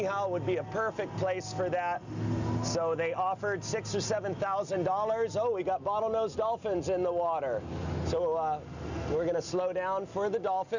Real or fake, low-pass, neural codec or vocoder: real; 7.2 kHz; none